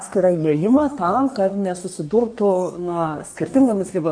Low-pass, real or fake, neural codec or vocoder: 9.9 kHz; fake; codec, 24 kHz, 1 kbps, SNAC